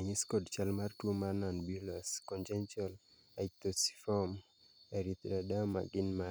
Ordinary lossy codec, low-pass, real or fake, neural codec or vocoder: none; none; real; none